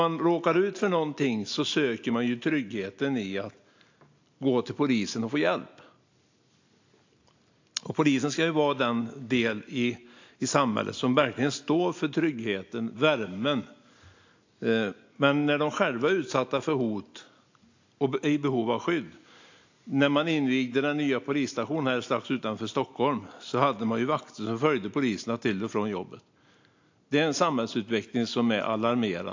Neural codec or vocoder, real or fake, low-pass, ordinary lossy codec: none; real; 7.2 kHz; AAC, 48 kbps